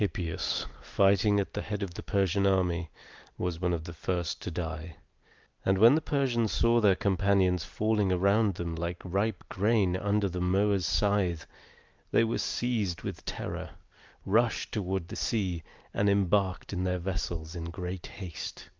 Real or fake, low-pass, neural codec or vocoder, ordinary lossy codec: real; 7.2 kHz; none; Opus, 24 kbps